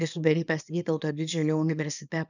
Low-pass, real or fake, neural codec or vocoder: 7.2 kHz; fake; codec, 24 kHz, 0.9 kbps, WavTokenizer, small release